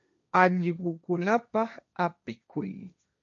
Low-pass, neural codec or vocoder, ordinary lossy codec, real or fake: 7.2 kHz; codec, 16 kHz, 1.1 kbps, Voila-Tokenizer; MP3, 64 kbps; fake